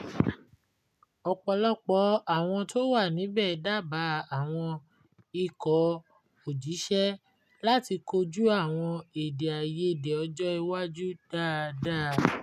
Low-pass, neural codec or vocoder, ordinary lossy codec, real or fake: 14.4 kHz; none; none; real